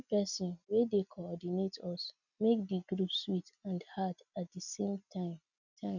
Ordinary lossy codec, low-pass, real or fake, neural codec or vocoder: none; 7.2 kHz; real; none